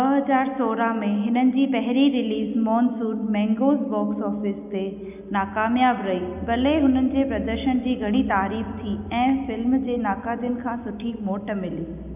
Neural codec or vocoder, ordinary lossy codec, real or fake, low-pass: none; none; real; 3.6 kHz